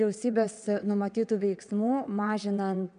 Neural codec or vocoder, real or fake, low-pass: vocoder, 22.05 kHz, 80 mel bands, WaveNeXt; fake; 9.9 kHz